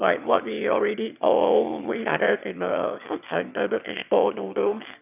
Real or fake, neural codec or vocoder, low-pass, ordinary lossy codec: fake; autoencoder, 22.05 kHz, a latent of 192 numbers a frame, VITS, trained on one speaker; 3.6 kHz; none